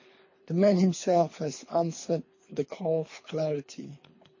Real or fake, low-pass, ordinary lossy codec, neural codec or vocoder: fake; 7.2 kHz; MP3, 32 kbps; codec, 24 kHz, 3 kbps, HILCodec